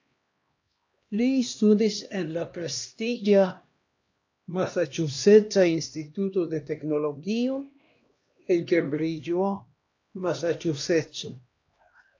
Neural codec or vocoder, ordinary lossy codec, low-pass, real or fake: codec, 16 kHz, 1 kbps, X-Codec, HuBERT features, trained on LibriSpeech; AAC, 48 kbps; 7.2 kHz; fake